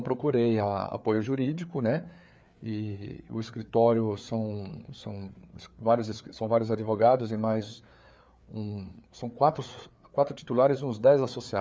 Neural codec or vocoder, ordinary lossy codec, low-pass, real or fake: codec, 16 kHz, 4 kbps, FreqCodec, larger model; none; none; fake